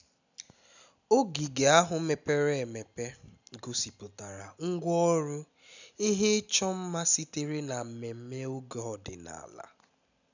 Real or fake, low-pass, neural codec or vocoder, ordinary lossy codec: real; 7.2 kHz; none; none